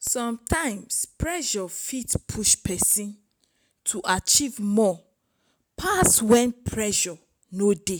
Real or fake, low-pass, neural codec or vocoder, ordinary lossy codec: real; none; none; none